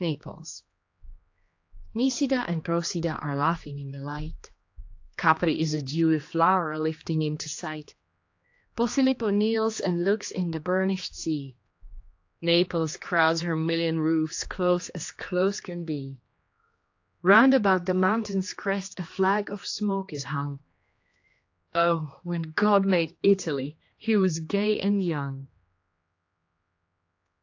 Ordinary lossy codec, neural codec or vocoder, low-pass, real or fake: AAC, 48 kbps; codec, 16 kHz, 2 kbps, X-Codec, HuBERT features, trained on general audio; 7.2 kHz; fake